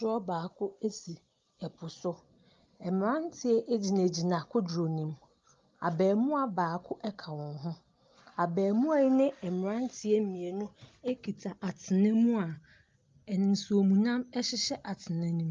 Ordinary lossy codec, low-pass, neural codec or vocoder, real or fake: Opus, 32 kbps; 7.2 kHz; none; real